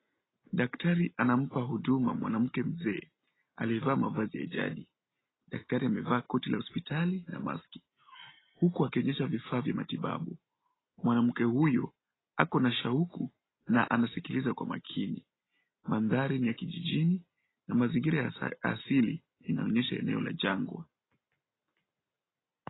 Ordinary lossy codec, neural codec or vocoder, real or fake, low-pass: AAC, 16 kbps; none; real; 7.2 kHz